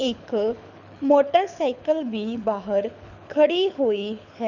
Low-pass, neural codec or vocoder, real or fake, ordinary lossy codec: 7.2 kHz; codec, 24 kHz, 6 kbps, HILCodec; fake; none